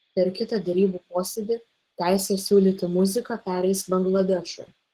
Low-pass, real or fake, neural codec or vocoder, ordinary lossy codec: 14.4 kHz; fake; codec, 44.1 kHz, 7.8 kbps, Pupu-Codec; Opus, 16 kbps